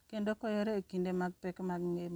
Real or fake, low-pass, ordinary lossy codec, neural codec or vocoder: real; none; none; none